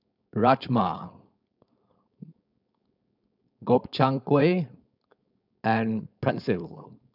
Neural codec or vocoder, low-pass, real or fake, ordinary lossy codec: codec, 16 kHz, 4.8 kbps, FACodec; 5.4 kHz; fake; none